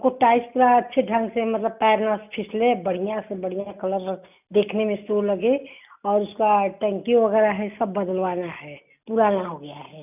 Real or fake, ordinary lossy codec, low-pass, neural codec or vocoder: real; none; 3.6 kHz; none